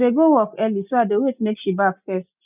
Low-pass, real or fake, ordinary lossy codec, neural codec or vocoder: 3.6 kHz; real; none; none